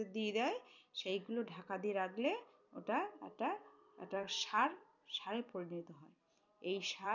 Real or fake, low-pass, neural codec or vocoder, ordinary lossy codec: fake; 7.2 kHz; vocoder, 44.1 kHz, 128 mel bands every 256 samples, BigVGAN v2; none